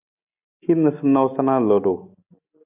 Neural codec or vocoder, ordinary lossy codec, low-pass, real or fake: none; AAC, 32 kbps; 3.6 kHz; real